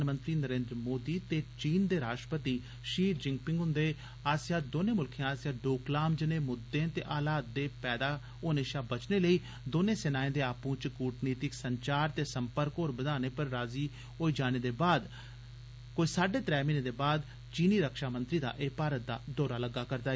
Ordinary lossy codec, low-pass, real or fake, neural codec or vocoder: none; none; real; none